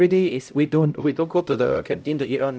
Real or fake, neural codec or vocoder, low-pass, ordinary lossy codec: fake; codec, 16 kHz, 0.5 kbps, X-Codec, HuBERT features, trained on LibriSpeech; none; none